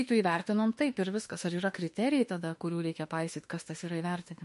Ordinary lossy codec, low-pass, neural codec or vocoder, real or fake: MP3, 48 kbps; 14.4 kHz; autoencoder, 48 kHz, 32 numbers a frame, DAC-VAE, trained on Japanese speech; fake